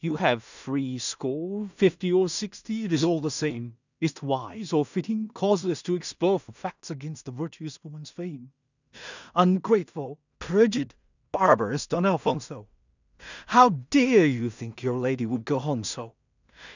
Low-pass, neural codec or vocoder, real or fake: 7.2 kHz; codec, 16 kHz in and 24 kHz out, 0.4 kbps, LongCat-Audio-Codec, two codebook decoder; fake